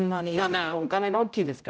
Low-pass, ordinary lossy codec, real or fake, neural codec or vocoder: none; none; fake; codec, 16 kHz, 0.5 kbps, X-Codec, HuBERT features, trained on general audio